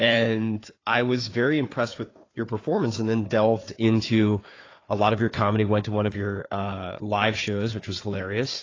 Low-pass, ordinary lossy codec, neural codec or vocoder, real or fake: 7.2 kHz; AAC, 32 kbps; codec, 16 kHz, 4 kbps, FunCodec, trained on Chinese and English, 50 frames a second; fake